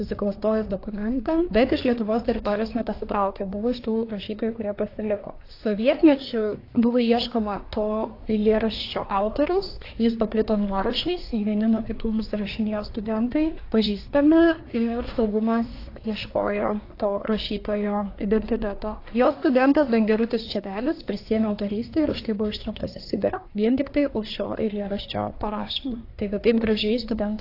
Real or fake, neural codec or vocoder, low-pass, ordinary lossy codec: fake; codec, 24 kHz, 1 kbps, SNAC; 5.4 kHz; AAC, 32 kbps